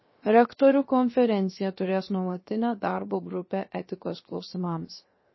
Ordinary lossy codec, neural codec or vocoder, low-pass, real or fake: MP3, 24 kbps; codec, 16 kHz, 0.7 kbps, FocalCodec; 7.2 kHz; fake